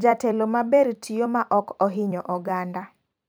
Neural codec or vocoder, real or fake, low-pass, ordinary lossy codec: vocoder, 44.1 kHz, 128 mel bands every 256 samples, BigVGAN v2; fake; none; none